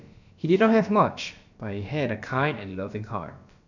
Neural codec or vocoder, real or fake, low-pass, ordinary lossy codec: codec, 16 kHz, about 1 kbps, DyCAST, with the encoder's durations; fake; 7.2 kHz; none